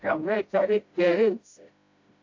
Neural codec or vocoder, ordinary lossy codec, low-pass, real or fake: codec, 16 kHz, 0.5 kbps, FreqCodec, smaller model; AAC, 48 kbps; 7.2 kHz; fake